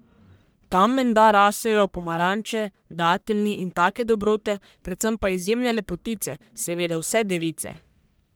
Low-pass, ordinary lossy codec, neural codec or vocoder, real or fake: none; none; codec, 44.1 kHz, 1.7 kbps, Pupu-Codec; fake